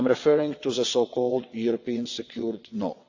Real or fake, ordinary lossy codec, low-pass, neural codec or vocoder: fake; AAC, 48 kbps; 7.2 kHz; vocoder, 22.05 kHz, 80 mel bands, WaveNeXt